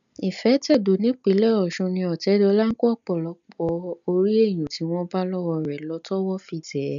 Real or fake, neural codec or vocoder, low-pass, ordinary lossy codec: real; none; 7.2 kHz; none